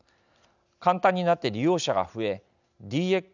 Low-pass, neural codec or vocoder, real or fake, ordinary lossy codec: 7.2 kHz; none; real; none